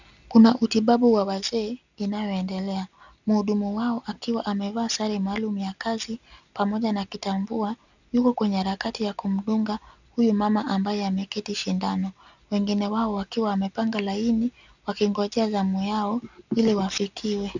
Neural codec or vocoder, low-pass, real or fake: none; 7.2 kHz; real